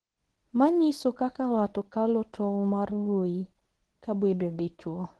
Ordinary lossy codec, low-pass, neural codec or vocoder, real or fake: Opus, 16 kbps; 10.8 kHz; codec, 24 kHz, 0.9 kbps, WavTokenizer, medium speech release version 1; fake